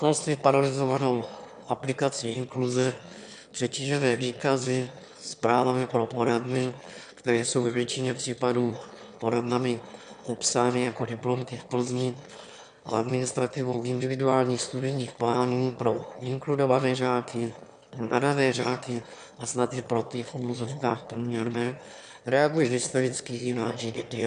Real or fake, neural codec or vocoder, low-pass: fake; autoencoder, 22.05 kHz, a latent of 192 numbers a frame, VITS, trained on one speaker; 9.9 kHz